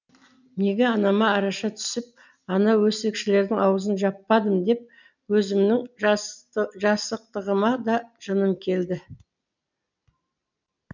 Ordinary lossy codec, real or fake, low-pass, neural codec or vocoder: none; real; 7.2 kHz; none